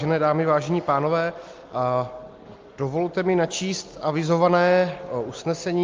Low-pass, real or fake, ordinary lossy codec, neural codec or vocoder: 7.2 kHz; real; Opus, 32 kbps; none